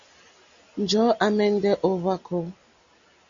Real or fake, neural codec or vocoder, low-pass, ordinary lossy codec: real; none; 7.2 kHz; Opus, 64 kbps